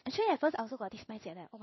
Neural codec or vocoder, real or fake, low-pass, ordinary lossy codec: codec, 16 kHz in and 24 kHz out, 1 kbps, XY-Tokenizer; fake; 7.2 kHz; MP3, 24 kbps